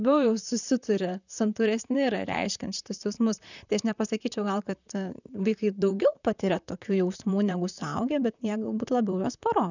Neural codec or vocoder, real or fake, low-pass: vocoder, 44.1 kHz, 128 mel bands, Pupu-Vocoder; fake; 7.2 kHz